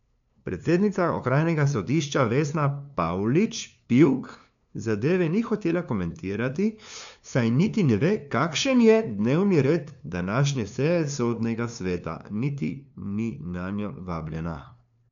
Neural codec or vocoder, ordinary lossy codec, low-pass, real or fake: codec, 16 kHz, 2 kbps, FunCodec, trained on LibriTTS, 25 frames a second; none; 7.2 kHz; fake